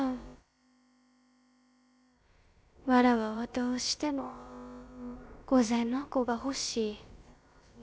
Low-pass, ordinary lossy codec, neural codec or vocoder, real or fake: none; none; codec, 16 kHz, about 1 kbps, DyCAST, with the encoder's durations; fake